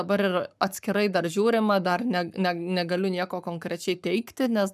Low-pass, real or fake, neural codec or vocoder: 14.4 kHz; fake; codec, 44.1 kHz, 7.8 kbps, Pupu-Codec